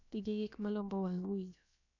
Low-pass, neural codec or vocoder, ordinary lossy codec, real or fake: 7.2 kHz; codec, 16 kHz, about 1 kbps, DyCAST, with the encoder's durations; none; fake